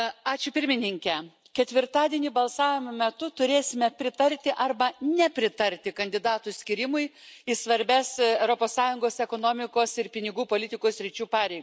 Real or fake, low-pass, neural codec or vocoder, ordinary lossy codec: real; none; none; none